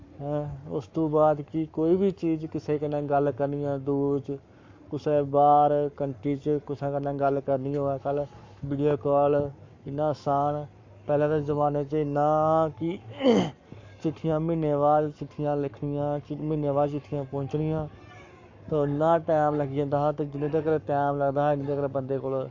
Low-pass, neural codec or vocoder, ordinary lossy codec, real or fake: 7.2 kHz; codec, 44.1 kHz, 7.8 kbps, Pupu-Codec; MP3, 48 kbps; fake